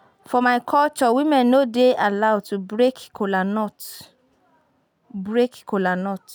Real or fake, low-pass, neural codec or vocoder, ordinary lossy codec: real; none; none; none